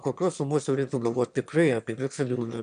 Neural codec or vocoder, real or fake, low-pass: autoencoder, 22.05 kHz, a latent of 192 numbers a frame, VITS, trained on one speaker; fake; 9.9 kHz